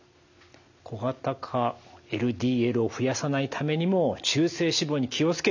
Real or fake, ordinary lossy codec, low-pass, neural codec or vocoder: real; none; 7.2 kHz; none